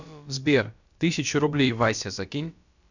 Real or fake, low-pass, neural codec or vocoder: fake; 7.2 kHz; codec, 16 kHz, about 1 kbps, DyCAST, with the encoder's durations